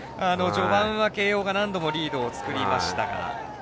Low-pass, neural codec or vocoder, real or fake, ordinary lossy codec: none; none; real; none